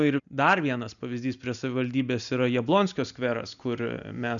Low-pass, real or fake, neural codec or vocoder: 7.2 kHz; real; none